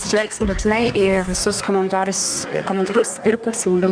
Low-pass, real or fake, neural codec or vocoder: 9.9 kHz; fake; codec, 24 kHz, 1 kbps, SNAC